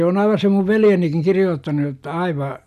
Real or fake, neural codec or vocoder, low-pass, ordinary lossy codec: real; none; 14.4 kHz; none